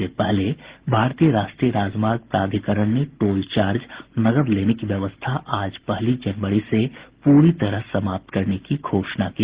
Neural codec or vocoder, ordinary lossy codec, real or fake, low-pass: none; Opus, 16 kbps; real; 3.6 kHz